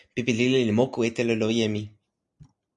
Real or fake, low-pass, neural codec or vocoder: real; 10.8 kHz; none